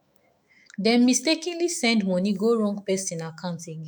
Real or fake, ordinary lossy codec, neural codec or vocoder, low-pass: fake; none; autoencoder, 48 kHz, 128 numbers a frame, DAC-VAE, trained on Japanese speech; none